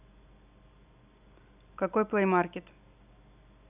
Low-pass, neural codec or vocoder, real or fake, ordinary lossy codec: 3.6 kHz; none; real; none